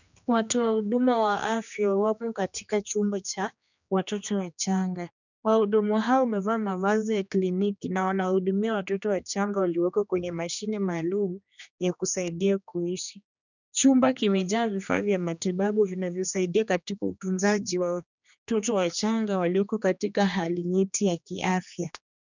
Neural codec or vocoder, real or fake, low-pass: codec, 16 kHz, 2 kbps, X-Codec, HuBERT features, trained on general audio; fake; 7.2 kHz